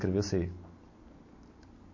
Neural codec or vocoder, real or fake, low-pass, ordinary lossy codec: none; real; 7.2 kHz; MP3, 32 kbps